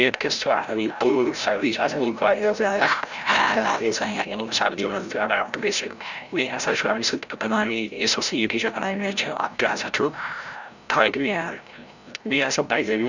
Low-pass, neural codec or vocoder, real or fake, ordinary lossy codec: 7.2 kHz; codec, 16 kHz, 0.5 kbps, FreqCodec, larger model; fake; none